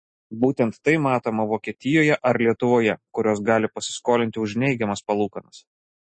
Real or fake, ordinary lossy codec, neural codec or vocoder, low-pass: real; MP3, 32 kbps; none; 9.9 kHz